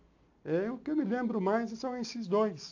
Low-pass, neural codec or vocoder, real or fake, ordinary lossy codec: 7.2 kHz; none; real; AAC, 48 kbps